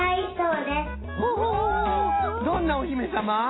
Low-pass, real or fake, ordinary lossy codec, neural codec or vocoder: 7.2 kHz; real; AAC, 16 kbps; none